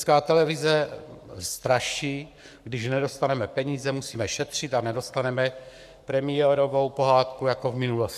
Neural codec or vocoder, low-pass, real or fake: codec, 44.1 kHz, 7.8 kbps, Pupu-Codec; 14.4 kHz; fake